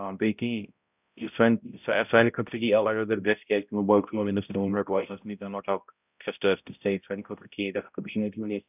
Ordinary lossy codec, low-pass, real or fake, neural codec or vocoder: none; 3.6 kHz; fake; codec, 16 kHz, 0.5 kbps, X-Codec, HuBERT features, trained on balanced general audio